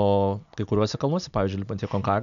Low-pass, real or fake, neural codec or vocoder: 7.2 kHz; real; none